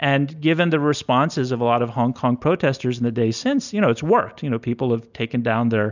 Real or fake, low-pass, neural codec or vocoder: real; 7.2 kHz; none